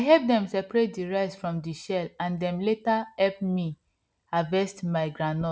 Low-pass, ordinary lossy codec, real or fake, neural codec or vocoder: none; none; real; none